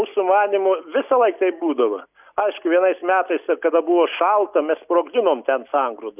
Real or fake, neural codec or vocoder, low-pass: real; none; 3.6 kHz